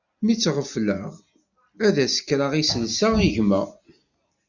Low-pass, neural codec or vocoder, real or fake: 7.2 kHz; none; real